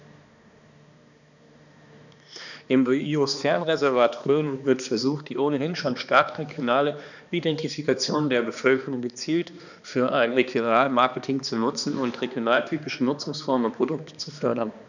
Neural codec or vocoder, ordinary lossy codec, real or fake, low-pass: codec, 16 kHz, 2 kbps, X-Codec, HuBERT features, trained on balanced general audio; none; fake; 7.2 kHz